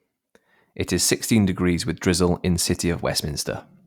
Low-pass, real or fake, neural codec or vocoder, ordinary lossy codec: 19.8 kHz; real; none; Opus, 64 kbps